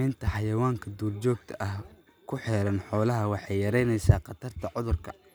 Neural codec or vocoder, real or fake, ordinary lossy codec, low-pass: none; real; none; none